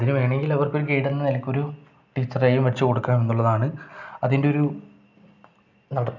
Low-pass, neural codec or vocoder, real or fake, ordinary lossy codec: 7.2 kHz; none; real; none